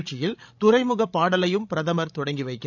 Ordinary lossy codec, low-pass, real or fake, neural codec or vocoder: none; 7.2 kHz; fake; codec, 16 kHz, 16 kbps, FreqCodec, larger model